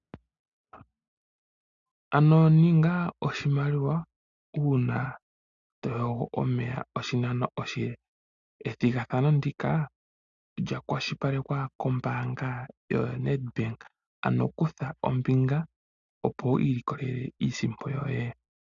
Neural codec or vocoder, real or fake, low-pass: none; real; 7.2 kHz